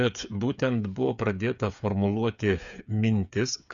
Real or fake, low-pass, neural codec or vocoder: fake; 7.2 kHz; codec, 16 kHz, 8 kbps, FreqCodec, smaller model